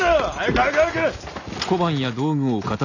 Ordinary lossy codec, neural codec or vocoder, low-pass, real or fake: none; none; 7.2 kHz; real